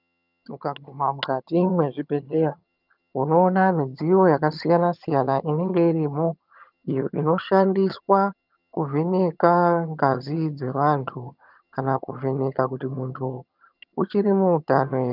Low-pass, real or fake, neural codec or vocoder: 5.4 kHz; fake; vocoder, 22.05 kHz, 80 mel bands, HiFi-GAN